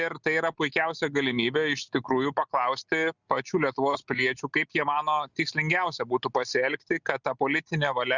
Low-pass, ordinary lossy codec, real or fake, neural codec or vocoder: 7.2 kHz; Opus, 64 kbps; real; none